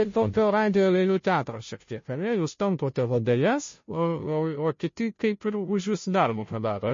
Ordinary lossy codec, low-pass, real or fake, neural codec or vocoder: MP3, 32 kbps; 7.2 kHz; fake; codec, 16 kHz, 0.5 kbps, FunCodec, trained on Chinese and English, 25 frames a second